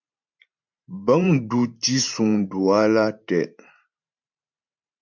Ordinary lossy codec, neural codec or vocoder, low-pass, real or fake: MP3, 64 kbps; vocoder, 44.1 kHz, 128 mel bands every 512 samples, BigVGAN v2; 7.2 kHz; fake